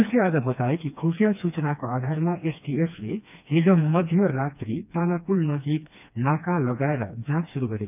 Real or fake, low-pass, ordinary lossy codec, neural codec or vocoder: fake; 3.6 kHz; none; codec, 16 kHz, 2 kbps, FreqCodec, smaller model